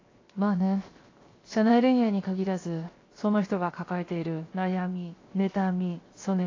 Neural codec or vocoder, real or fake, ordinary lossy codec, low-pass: codec, 16 kHz, 0.7 kbps, FocalCodec; fake; AAC, 32 kbps; 7.2 kHz